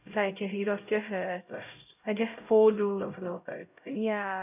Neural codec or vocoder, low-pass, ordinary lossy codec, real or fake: codec, 16 kHz, 0.5 kbps, X-Codec, HuBERT features, trained on LibriSpeech; 3.6 kHz; none; fake